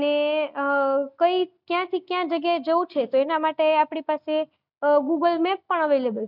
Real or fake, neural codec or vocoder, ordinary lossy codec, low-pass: real; none; none; 5.4 kHz